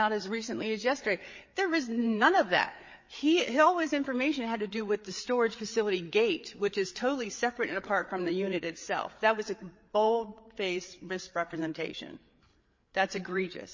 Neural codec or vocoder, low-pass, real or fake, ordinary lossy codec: codec, 16 kHz, 8 kbps, FreqCodec, larger model; 7.2 kHz; fake; MP3, 32 kbps